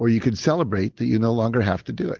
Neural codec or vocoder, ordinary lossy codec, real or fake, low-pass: none; Opus, 16 kbps; real; 7.2 kHz